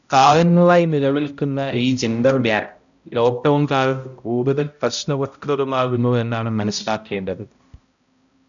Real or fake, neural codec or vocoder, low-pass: fake; codec, 16 kHz, 0.5 kbps, X-Codec, HuBERT features, trained on balanced general audio; 7.2 kHz